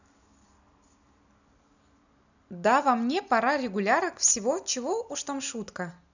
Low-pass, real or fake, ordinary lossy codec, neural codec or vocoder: 7.2 kHz; real; none; none